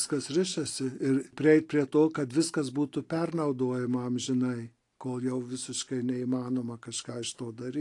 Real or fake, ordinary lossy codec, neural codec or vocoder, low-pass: real; AAC, 48 kbps; none; 10.8 kHz